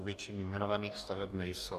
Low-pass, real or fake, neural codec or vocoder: 14.4 kHz; fake; codec, 44.1 kHz, 2.6 kbps, DAC